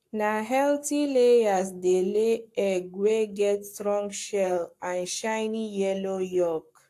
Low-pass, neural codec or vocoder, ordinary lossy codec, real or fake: 14.4 kHz; codec, 44.1 kHz, 7.8 kbps, Pupu-Codec; AAC, 64 kbps; fake